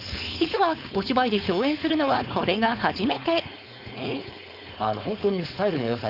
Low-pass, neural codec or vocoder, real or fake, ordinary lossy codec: 5.4 kHz; codec, 16 kHz, 4.8 kbps, FACodec; fake; none